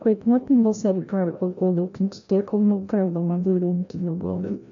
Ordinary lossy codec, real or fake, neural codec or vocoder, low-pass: AAC, 64 kbps; fake; codec, 16 kHz, 0.5 kbps, FreqCodec, larger model; 7.2 kHz